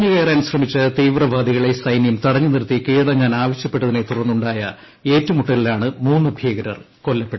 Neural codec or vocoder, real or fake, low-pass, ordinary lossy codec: none; real; 7.2 kHz; MP3, 24 kbps